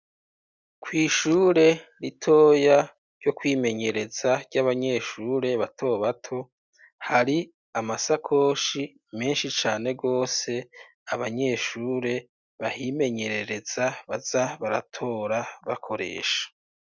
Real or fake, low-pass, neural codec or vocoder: real; 7.2 kHz; none